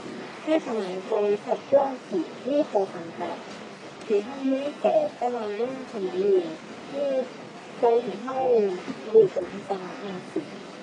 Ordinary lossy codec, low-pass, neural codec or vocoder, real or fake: none; 10.8 kHz; codec, 44.1 kHz, 1.7 kbps, Pupu-Codec; fake